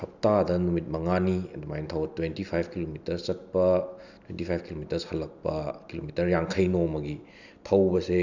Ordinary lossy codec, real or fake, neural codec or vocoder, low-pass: none; real; none; 7.2 kHz